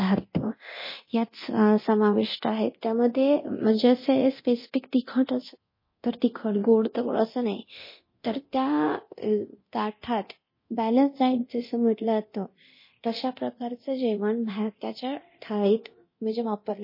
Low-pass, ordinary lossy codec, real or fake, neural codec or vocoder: 5.4 kHz; MP3, 24 kbps; fake; codec, 24 kHz, 0.9 kbps, DualCodec